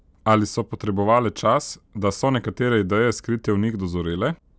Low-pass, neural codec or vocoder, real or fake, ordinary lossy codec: none; none; real; none